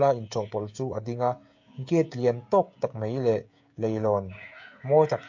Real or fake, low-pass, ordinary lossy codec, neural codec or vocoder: fake; 7.2 kHz; MP3, 48 kbps; codec, 16 kHz, 16 kbps, FreqCodec, smaller model